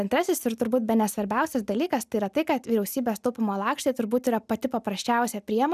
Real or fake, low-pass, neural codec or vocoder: real; 14.4 kHz; none